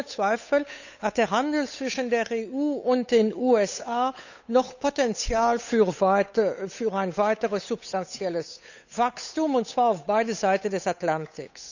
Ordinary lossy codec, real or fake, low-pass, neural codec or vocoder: none; fake; 7.2 kHz; codec, 16 kHz, 8 kbps, FunCodec, trained on Chinese and English, 25 frames a second